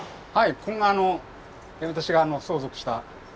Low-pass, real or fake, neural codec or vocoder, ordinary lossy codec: none; real; none; none